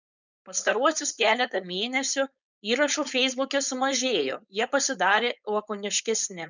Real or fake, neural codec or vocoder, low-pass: fake; codec, 16 kHz, 4.8 kbps, FACodec; 7.2 kHz